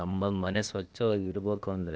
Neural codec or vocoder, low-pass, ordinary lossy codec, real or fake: codec, 16 kHz, 0.8 kbps, ZipCodec; none; none; fake